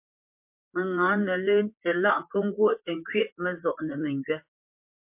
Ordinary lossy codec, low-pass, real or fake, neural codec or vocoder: MP3, 32 kbps; 3.6 kHz; fake; vocoder, 44.1 kHz, 128 mel bands, Pupu-Vocoder